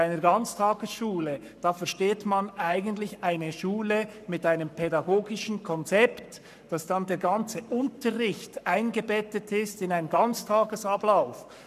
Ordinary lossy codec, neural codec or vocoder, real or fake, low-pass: none; codec, 44.1 kHz, 7.8 kbps, Pupu-Codec; fake; 14.4 kHz